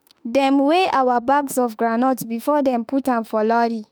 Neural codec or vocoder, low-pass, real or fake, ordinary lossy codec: autoencoder, 48 kHz, 32 numbers a frame, DAC-VAE, trained on Japanese speech; none; fake; none